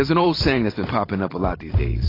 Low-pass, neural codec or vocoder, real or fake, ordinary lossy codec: 5.4 kHz; vocoder, 44.1 kHz, 128 mel bands every 512 samples, BigVGAN v2; fake; AAC, 24 kbps